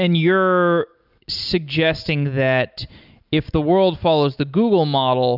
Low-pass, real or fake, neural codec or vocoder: 5.4 kHz; real; none